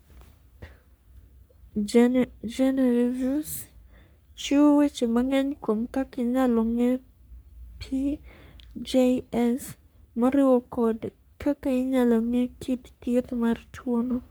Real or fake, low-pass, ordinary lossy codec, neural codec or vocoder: fake; none; none; codec, 44.1 kHz, 3.4 kbps, Pupu-Codec